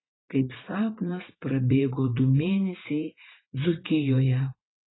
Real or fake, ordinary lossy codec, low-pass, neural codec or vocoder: fake; AAC, 16 kbps; 7.2 kHz; vocoder, 44.1 kHz, 80 mel bands, Vocos